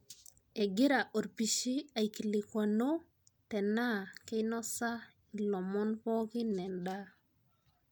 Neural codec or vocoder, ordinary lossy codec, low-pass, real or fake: none; none; none; real